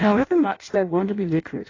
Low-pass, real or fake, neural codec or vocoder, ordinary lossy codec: 7.2 kHz; fake; codec, 16 kHz in and 24 kHz out, 0.6 kbps, FireRedTTS-2 codec; AAC, 32 kbps